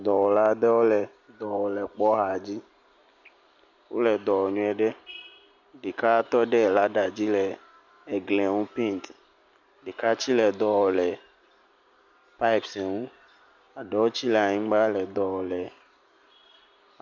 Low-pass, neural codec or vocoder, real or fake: 7.2 kHz; none; real